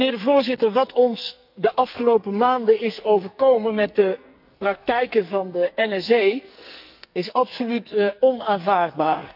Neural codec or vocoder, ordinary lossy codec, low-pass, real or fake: codec, 44.1 kHz, 2.6 kbps, SNAC; none; 5.4 kHz; fake